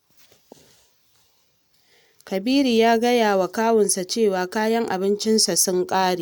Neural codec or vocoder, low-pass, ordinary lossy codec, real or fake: none; none; none; real